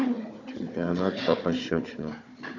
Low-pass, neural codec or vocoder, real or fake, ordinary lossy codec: 7.2 kHz; codec, 16 kHz, 4 kbps, FunCodec, trained on Chinese and English, 50 frames a second; fake; AAC, 48 kbps